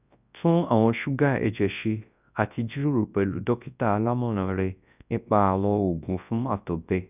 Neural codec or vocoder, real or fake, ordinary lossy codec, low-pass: codec, 24 kHz, 0.9 kbps, WavTokenizer, large speech release; fake; none; 3.6 kHz